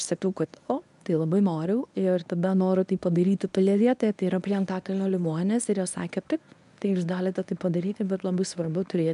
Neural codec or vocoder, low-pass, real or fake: codec, 24 kHz, 0.9 kbps, WavTokenizer, medium speech release version 1; 10.8 kHz; fake